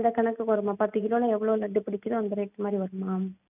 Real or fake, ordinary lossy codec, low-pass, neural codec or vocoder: real; none; 3.6 kHz; none